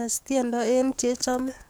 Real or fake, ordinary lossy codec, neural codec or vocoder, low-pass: fake; none; codec, 44.1 kHz, 7.8 kbps, DAC; none